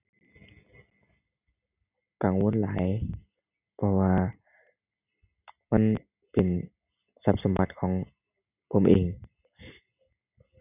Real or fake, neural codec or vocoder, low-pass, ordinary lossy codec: real; none; 3.6 kHz; none